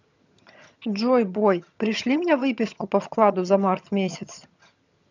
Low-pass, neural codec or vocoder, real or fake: 7.2 kHz; vocoder, 22.05 kHz, 80 mel bands, HiFi-GAN; fake